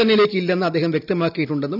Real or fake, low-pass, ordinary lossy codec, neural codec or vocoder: real; 5.4 kHz; none; none